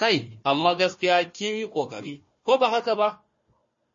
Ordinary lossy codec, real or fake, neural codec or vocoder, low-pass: MP3, 32 kbps; fake; codec, 16 kHz, 1 kbps, FunCodec, trained on Chinese and English, 50 frames a second; 7.2 kHz